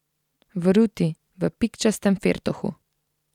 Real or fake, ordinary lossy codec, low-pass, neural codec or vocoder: fake; none; 19.8 kHz; vocoder, 44.1 kHz, 128 mel bands every 256 samples, BigVGAN v2